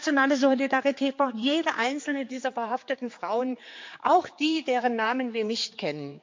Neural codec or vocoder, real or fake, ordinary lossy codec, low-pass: codec, 16 kHz, 4 kbps, X-Codec, HuBERT features, trained on general audio; fake; MP3, 48 kbps; 7.2 kHz